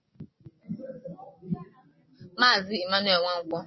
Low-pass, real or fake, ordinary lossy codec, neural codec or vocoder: 7.2 kHz; real; MP3, 24 kbps; none